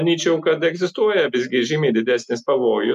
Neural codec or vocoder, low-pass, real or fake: none; 14.4 kHz; real